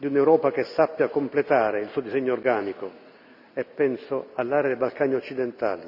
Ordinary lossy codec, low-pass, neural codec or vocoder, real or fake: none; 5.4 kHz; none; real